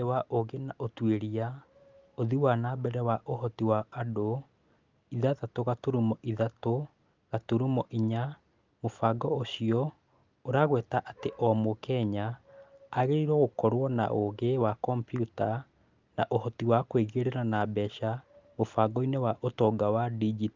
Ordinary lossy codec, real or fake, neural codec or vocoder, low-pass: Opus, 32 kbps; real; none; 7.2 kHz